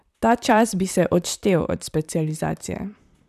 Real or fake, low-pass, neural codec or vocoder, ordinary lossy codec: fake; 14.4 kHz; vocoder, 44.1 kHz, 128 mel bands, Pupu-Vocoder; none